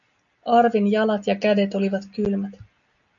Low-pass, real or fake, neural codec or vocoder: 7.2 kHz; real; none